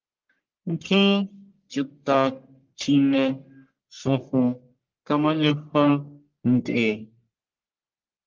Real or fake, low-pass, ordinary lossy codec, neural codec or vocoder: fake; 7.2 kHz; Opus, 32 kbps; codec, 44.1 kHz, 1.7 kbps, Pupu-Codec